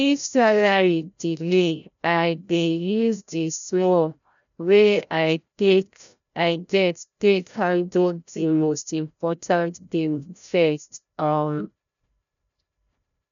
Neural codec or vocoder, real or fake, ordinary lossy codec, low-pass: codec, 16 kHz, 0.5 kbps, FreqCodec, larger model; fake; none; 7.2 kHz